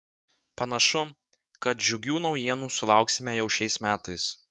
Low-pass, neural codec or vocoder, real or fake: 10.8 kHz; codec, 44.1 kHz, 7.8 kbps, DAC; fake